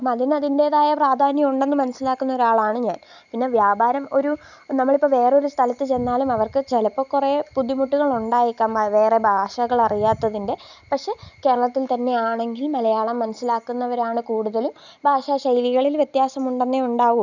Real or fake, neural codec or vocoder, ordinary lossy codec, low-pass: real; none; none; 7.2 kHz